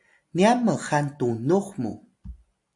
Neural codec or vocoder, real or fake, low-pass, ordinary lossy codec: none; real; 10.8 kHz; AAC, 64 kbps